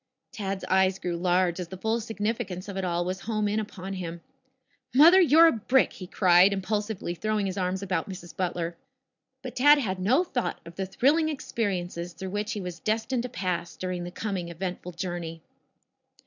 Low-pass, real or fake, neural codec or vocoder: 7.2 kHz; real; none